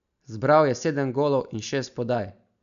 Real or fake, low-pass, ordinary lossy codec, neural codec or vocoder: real; 7.2 kHz; none; none